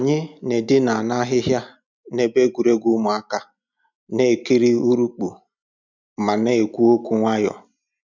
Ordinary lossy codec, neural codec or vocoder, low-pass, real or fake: none; none; 7.2 kHz; real